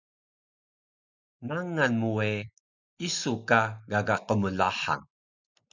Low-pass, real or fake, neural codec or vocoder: 7.2 kHz; real; none